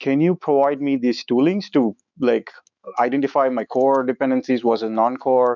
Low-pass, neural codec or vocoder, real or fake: 7.2 kHz; autoencoder, 48 kHz, 128 numbers a frame, DAC-VAE, trained on Japanese speech; fake